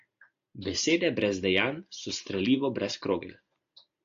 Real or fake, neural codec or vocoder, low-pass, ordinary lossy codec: real; none; 7.2 kHz; AAC, 48 kbps